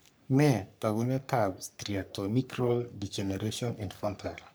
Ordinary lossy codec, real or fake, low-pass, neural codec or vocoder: none; fake; none; codec, 44.1 kHz, 3.4 kbps, Pupu-Codec